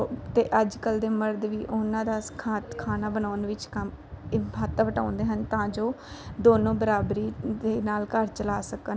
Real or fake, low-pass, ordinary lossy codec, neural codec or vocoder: real; none; none; none